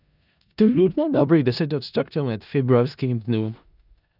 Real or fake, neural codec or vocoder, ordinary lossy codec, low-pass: fake; codec, 16 kHz in and 24 kHz out, 0.4 kbps, LongCat-Audio-Codec, four codebook decoder; none; 5.4 kHz